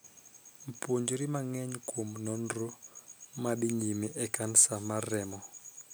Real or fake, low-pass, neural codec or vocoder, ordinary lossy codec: real; none; none; none